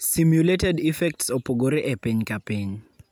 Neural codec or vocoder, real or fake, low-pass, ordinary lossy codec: none; real; none; none